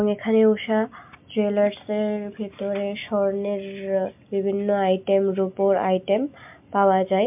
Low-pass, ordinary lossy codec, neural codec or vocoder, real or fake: 3.6 kHz; MP3, 32 kbps; none; real